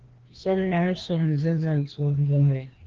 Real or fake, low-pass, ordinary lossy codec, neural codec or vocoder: fake; 7.2 kHz; Opus, 16 kbps; codec, 16 kHz, 1 kbps, FreqCodec, larger model